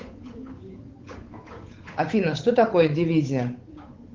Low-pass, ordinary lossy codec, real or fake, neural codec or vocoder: 7.2 kHz; Opus, 24 kbps; fake; codec, 16 kHz, 8 kbps, FunCodec, trained on Chinese and English, 25 frames a second